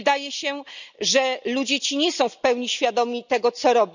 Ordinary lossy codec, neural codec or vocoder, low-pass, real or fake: none; none; 7.2 kHz; real